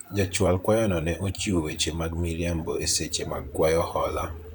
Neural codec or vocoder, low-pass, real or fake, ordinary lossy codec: vocoder, 44.1 kHz, 128 mel bands, Pupu-Vocoder; none; fake; none